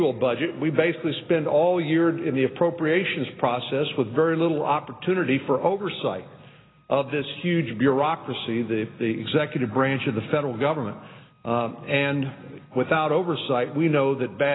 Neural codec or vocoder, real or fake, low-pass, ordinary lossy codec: none; real; 7.2 kHz; AAC, 16 kbps